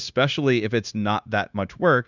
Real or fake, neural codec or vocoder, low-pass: fake; codec, 16 kHz, 0.9 kbps, LongCat-Audio-Codec; 7.2 kHz